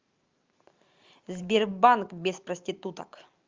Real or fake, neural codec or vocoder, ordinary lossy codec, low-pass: fake; vocoder, 44.1 kHz, 128 mel bands every 512 samples, BigVGAN v2; Opus, 32 kbps; 7.2 kHz